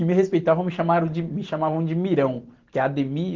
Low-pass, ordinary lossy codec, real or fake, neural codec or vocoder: 7.2 kHz; Opus, 16 kbps; real; none